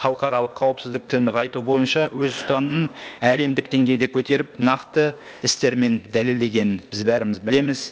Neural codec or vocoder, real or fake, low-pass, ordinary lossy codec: codec, 16 kHz, 0.8 kbps, ZipCodec; fake; none; none